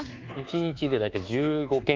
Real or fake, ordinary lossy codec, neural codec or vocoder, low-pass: fake; Opus, 32 kbps; codec, 24 kHz, 1.2 kbps, DualCodec; 7.2 kHz